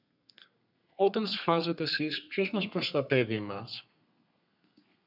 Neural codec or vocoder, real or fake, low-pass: codec, 32 kHz, 1.9 kbps, SNAC; fake; 5.4 kHz